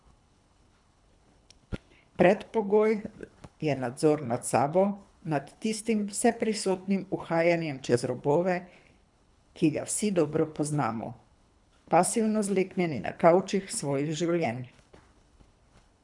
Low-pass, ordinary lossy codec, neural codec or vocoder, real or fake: 10.8 kHz; none; codec, 24 kHz, 3 kbps, HILCodec; fake